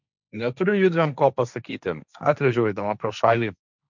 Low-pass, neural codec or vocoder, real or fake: 7.2 kHz; codec, 16 kHz, 1.1 kbps, Voila-Tokenizer; fake